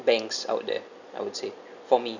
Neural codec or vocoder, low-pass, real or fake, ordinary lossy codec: none; 7.2 kHz; real; none